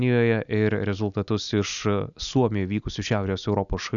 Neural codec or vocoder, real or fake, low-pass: none; real; 7.2 kHz